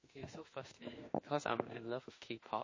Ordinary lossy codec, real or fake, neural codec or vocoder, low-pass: MP3, 32 kbps; fake; autoencoder, 48 kHz, 32 numbers a frame, DAC-VAE, trained on Japanese speech; 7.2 kHz